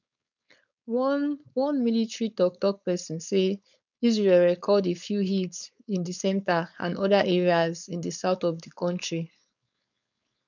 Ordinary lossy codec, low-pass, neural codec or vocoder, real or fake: none; 7.2 kHz; codec, 16 kHz, 4.8 kbps, FACodec; fake